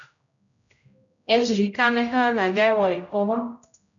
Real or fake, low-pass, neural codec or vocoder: fake; 7.2 kHz; codec, 16 kHz, 0.5 kbps, X-Codec, HuBERT features, trained on general audio